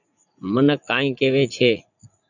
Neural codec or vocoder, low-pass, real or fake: vocoder, 44.1 kHz, 80 mel bands, Vocos; 7.2 kHz; fake